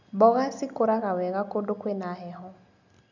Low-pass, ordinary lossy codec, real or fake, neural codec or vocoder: 7.2 kHz; none; real; none